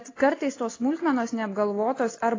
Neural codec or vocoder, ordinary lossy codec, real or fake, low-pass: none; AAC, 32 kbps; real; 7.2 kHz